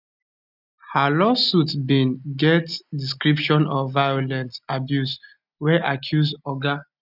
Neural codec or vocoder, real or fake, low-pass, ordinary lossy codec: none; real; 5.4 kHz; none